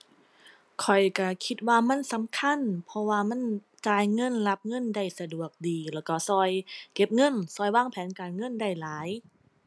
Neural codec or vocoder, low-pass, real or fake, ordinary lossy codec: none; none; real; none